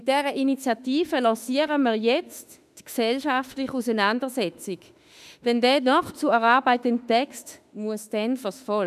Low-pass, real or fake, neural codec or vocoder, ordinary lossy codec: 14.4 kHz; fake; autoencoder, 48 kHz, 32 numbers a frame, DAC-VAE, trained on Japanese speech; none